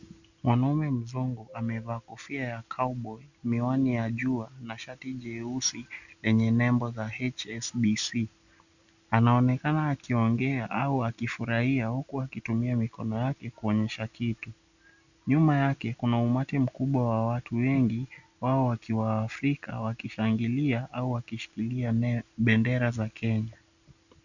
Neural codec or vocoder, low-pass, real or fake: none; 7.2 kHz; real